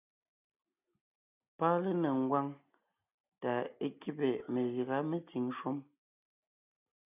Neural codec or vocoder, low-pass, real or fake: none; 3.6 kHz; real